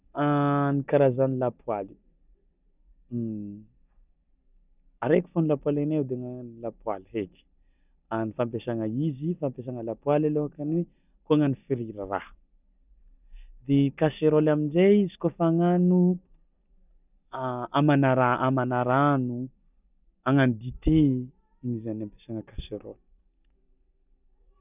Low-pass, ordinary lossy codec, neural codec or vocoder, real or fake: 3.6 kHz; none; none; real